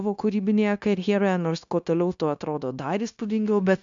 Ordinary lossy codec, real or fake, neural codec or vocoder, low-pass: MP3, 64 kbps; fake; codec, 16 kHz, 0.9 kbps, LongCat-Audio-Codec; 7.2 kHz